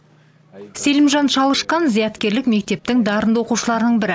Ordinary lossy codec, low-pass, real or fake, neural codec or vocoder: none; none; real; none